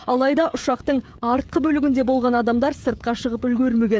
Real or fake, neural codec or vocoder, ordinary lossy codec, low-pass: fake; codec, 16 kHz, 16 kbps, FreqCodec, smaller model; none; none